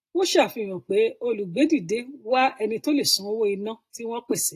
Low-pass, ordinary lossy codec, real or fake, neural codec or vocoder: 14.4 kHz; AAC, 48 kbps; real; none